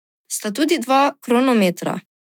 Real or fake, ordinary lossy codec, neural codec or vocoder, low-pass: fake; none; autoencoder, 48 kHz, 128 numbers a frame, DAC-VAE, trained on Japanese speech; 19.8 kHz